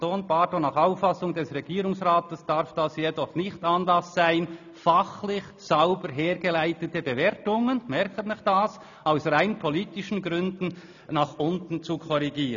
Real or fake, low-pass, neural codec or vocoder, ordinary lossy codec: real; 7.2 kHz; none; none